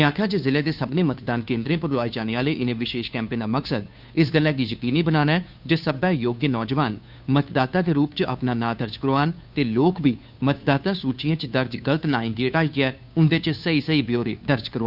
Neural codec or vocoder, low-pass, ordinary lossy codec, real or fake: codec, 16 kHz, 2 kbps, FunCodec, trained on Chinese and English, 25 frames a second; 5.4 kHz; none; fake